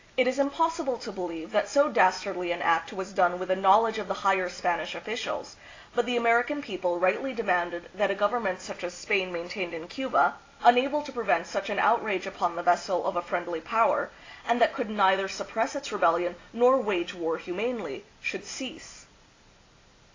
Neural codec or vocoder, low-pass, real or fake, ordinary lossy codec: none; 7.2 kHz; real; AAC, 32 kbps